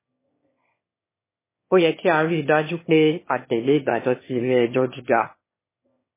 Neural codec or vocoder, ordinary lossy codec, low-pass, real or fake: autoencoder, 22.05 kHz, a latent of 192 numbers a frame, VITS, trained on one speaker; MP3, 16 kbps; 3.6 kHz; fake